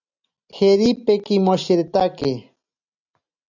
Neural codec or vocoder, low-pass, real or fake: none; 7.2 kHz; real